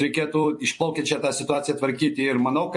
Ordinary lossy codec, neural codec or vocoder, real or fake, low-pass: MP3, 48 kbps; vocoder, 44.1 kHz, 128 mel bands every 256 samples, BigVGAN v2; fake; 10.8 kHz